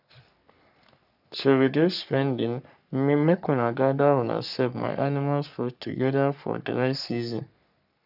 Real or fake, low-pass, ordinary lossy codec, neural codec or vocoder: fake; 5.4 kHz; none; codec, 44.1 kHz, 3.4 kbps, Pupu-Codec